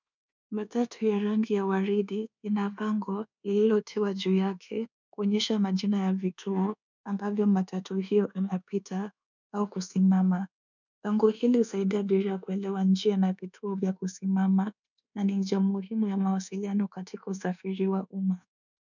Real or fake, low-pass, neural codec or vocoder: fake; 7.2 kHz; codec, 24 kHz, 1.2 kbps, DualCodec